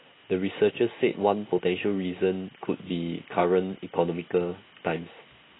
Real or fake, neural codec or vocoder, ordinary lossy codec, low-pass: real; none; AAC, 16 kbps; 7.2 kHz